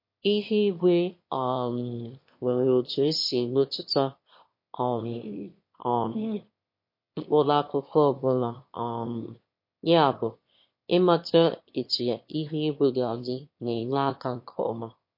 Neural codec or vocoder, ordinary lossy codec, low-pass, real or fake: autoencoder, 22.05 kHz, a latent of 192 numbers a frame, VITS, trained on one speaker; MP3, 32 kbps; 5.4 kHz; fake